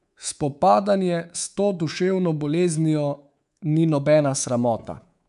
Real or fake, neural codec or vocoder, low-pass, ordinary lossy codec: fake; codec, 24 kHz, 3.1 kbps, DualCodec; 10.8 kHz; none